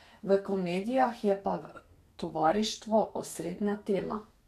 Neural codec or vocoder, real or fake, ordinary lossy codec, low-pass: codec, 32 kHz, 1.9 kbps, SNAC; fake; none; 14.4 kHz